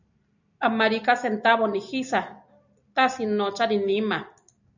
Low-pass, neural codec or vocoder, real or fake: 7.2 kHz; none; real